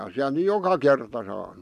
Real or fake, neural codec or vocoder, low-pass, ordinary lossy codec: real; none; 14.4 kHz; none